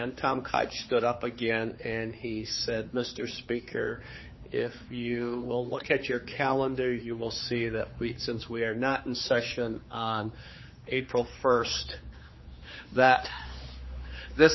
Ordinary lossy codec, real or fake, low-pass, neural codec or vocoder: MP3, 24 kbps; fake; 7.2 kHz; codec, 16 kHz, 4 kbps, X-Codec, HuBERT features, trained on general audio